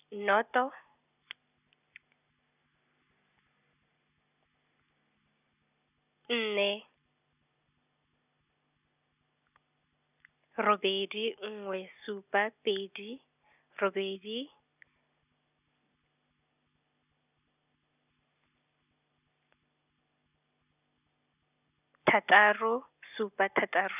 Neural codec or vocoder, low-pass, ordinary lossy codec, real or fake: none; 3.6 kHz; none; real